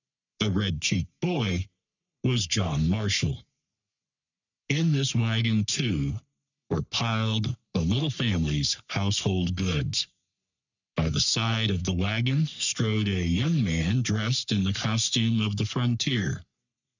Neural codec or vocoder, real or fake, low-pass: codec, 44.1 kHz, 3.4 kbps, Pupu-Codec; fake; 7.2 kHz